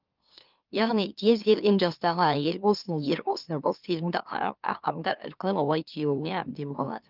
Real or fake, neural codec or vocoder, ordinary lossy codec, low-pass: fake; autoencoder, 44.1 kHz, a latent of 192 numbers a frame, MeloTTS; Opus, 32 kbps; 5.4 kHz